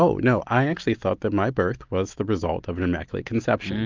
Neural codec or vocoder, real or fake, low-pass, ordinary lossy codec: none; real; 7.2 kHz; Opus, 24 kbps